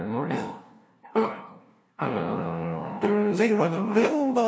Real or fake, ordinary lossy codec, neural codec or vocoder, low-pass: fake; none; codec, 16 kHz, 0.5 kbps, FunCodec, trained on LibriTTS, 25 frames a second; none